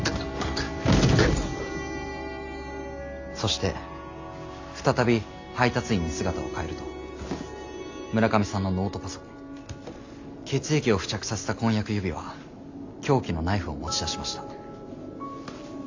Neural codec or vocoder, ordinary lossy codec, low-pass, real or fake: none; AAC, 48 kbps; 7.2 kHz; real